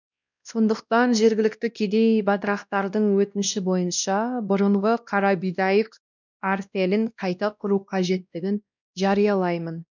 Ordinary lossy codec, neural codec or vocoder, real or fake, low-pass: none; codec, 16 kHz, 1 kbps, X-Codec, WavLM features, trained on Multilingual LibriSpeech; fake; 7.2 kHz